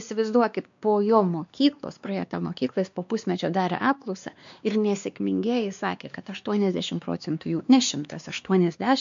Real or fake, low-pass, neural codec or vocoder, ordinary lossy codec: fake; 7.2 kHz; codec, 16 kHz, 2 kbps, X-Codec, WavLM features, trained on Multilingual LibriSpeech; MP3, 64 kbps